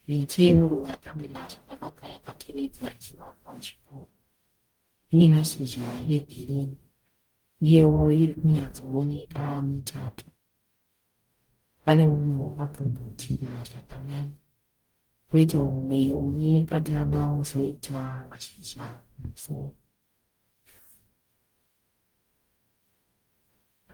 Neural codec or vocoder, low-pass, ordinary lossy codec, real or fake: codec, 44.1 kHz, 0.9 kbps, DAC; 19.8 kHz; Opus, 32 kbps; fake